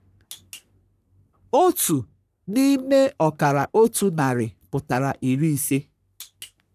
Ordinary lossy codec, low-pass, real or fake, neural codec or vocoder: none; 14.4 kHz; fake; codec, 44.1 kHz, 3.4 kbps, Pupu-Codec